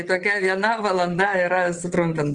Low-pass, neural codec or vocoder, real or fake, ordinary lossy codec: 9.9 kHz; vocoder, 22.05 kHz, 80 mel bands, WaveNeXt; fake; Opus, 24 kbps